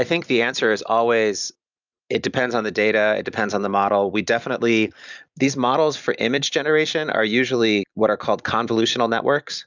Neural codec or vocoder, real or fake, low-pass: none; real; 7.2 kHz